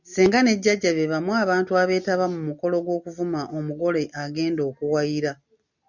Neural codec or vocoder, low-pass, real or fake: none; 7.2 kHz; real